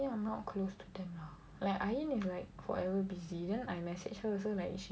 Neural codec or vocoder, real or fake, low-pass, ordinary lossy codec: none; real; none; none